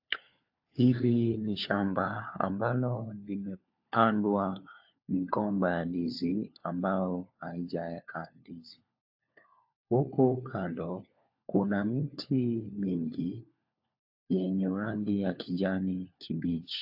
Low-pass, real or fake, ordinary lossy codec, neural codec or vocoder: 5.4 kHz; fake; AAC, 48 kbps; codec, 16 kHz, 4 kbps, FunCodec, trained on LibriTTS, 50 frames a second